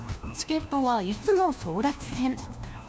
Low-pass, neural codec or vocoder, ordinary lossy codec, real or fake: none; codec, 16 kHz, 1 kbps, FunCodec, trained on LibriTTS, 50 frames a second; none; fake